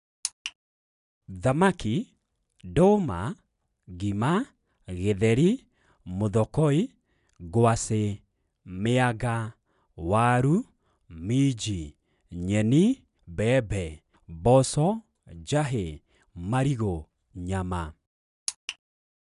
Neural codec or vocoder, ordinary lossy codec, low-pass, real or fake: none; none; 10.8 kHz; real